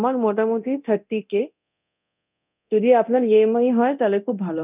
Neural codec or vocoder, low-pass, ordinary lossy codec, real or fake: codec, 24 kHz, 0.9 kbps, DualCodec; 3.6 kHz; none; fake